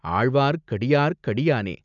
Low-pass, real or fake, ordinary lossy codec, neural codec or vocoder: 7.2 kHz; real; none; none